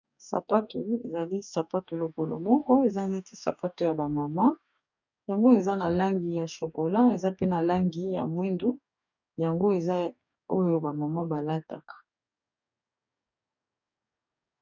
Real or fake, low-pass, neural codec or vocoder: fake; 7.2 kHz; codec, 44.1 kHz, 2.6 kbps, DAC